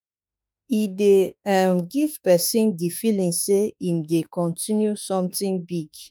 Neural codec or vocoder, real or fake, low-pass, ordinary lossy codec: autoencoder, 48 kHz, 32 numbers a frame, DAC-VAE, trained on Japanese speech; fake; none; none